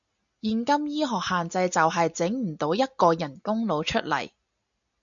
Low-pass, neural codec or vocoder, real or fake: 7.2 kHz; none; real